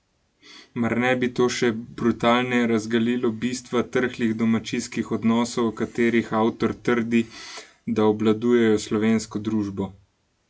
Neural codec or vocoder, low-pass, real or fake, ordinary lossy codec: none; none; real; none